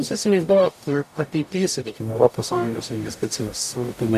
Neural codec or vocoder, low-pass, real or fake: codec, 44.1 kHz, 0.9 kbps, DAC; 14.4 kHz; fake